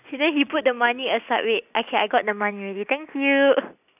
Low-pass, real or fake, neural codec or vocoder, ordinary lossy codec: 3.6 kHz; real; none; none